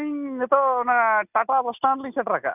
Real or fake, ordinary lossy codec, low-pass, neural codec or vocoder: real; none; 3.6 kHz; none